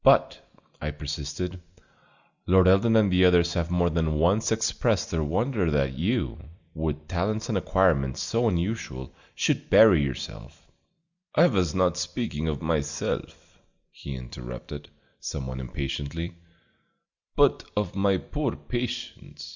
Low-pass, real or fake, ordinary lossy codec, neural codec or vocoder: 7.2 kHz; real; Opus, 64 kbps; none